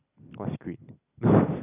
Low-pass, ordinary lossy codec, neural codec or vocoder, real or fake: 3.6 kHz; none; none; real